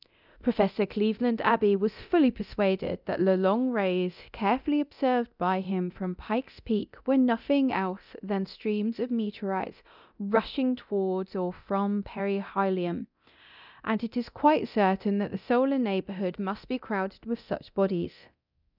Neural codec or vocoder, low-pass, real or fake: codec, 24 kHz, 0.9 kbps, DualCodec; 5.4 kHz; fake